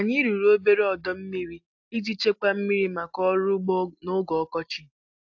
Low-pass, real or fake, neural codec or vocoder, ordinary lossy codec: 7.2 kHz; real; none; AAC, 48 kbps